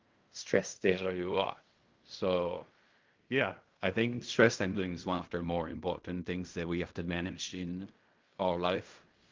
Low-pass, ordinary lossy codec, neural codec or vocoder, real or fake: 7.2 kHz; Opus, 24 kbps; codec, 16 kHz in and 24 kHz out, 0.4 kbps, LongCat-Audio-Codec, fine tuned four codebook decoder; fake